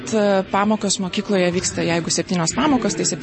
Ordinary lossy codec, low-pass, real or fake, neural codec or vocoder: MP3, 32 kbps; 9.9 kHz; real; none